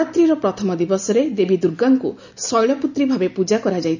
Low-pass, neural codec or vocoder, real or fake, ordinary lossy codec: 7.2 kHz; none; real; none